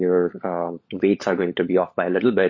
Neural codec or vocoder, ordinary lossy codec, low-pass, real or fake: codec, 16 kHz, 16 kbps, FunCodec, trained on LibriTTS, 50 frames a second; MP3, 32 kbps; 7.2 kHz; fake